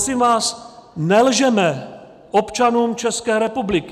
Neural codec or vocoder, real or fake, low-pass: none; real; 14.4 kHz